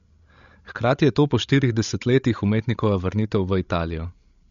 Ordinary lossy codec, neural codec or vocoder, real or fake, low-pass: MP3, 48 kbps; codec, 16 kHz, 16 kbps, FreqCodec, larger model; fake; 7.2 kHz